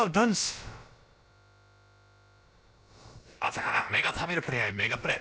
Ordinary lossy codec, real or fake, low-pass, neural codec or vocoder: none; fake; none; codec, 16 kHz, about 1 kbps, DyCAST, with the encoder's durations